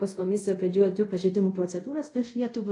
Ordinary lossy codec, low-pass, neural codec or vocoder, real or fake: AAC, 48 kbps; 10.8 kHz; codec, 24 kHz, 0.5 kbps, DualCodec; fake